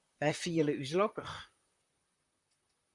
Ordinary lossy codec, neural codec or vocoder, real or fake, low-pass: MP3, 96 kbps; vocoder, 44.1 kHz, 128 mel bands, Pupu-Vocoder; fake; 10.8 kHz